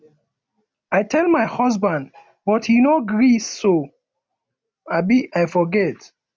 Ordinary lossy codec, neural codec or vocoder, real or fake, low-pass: none; none; real; none